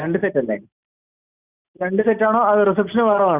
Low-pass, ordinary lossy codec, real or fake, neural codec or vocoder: 3.6 kHz; Opus, 64 kbps; real; none